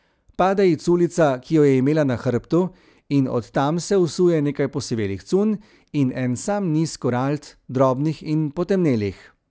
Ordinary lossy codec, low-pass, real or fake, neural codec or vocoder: none; none; real; none